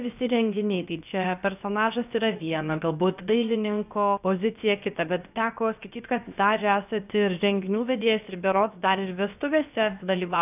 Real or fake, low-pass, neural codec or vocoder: fake; 3.6 kHz; codec, 16 kHz, 0.7 kbps, FocalCodec